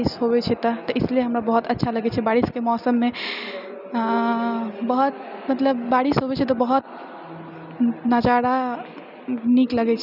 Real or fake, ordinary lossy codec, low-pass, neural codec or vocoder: real; none; 5.4 kHz; none